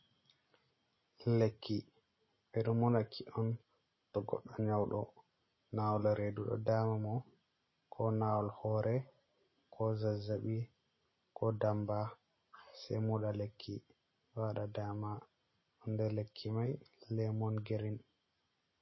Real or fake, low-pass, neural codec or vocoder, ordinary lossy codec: real; 7.2 kHz; none; MP3, 24 kbps